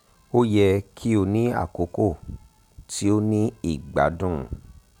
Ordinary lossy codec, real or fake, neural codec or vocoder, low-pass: none; real; none; 19.8 kHz